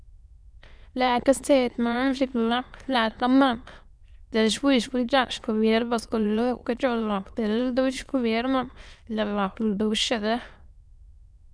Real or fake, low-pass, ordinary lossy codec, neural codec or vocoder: fake; none; none; autoencoder, 22.05 kHz, a latent of 192 numbers a frame, VITS, trained on many speakers